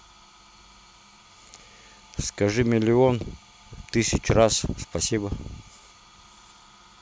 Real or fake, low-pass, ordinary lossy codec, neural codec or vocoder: real; none; none; none